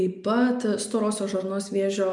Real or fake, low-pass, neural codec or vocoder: real; 10.8 kHz; none